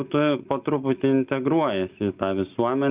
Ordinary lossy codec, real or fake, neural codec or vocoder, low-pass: Opus, 32 kbps; real; none; 3.6 kHz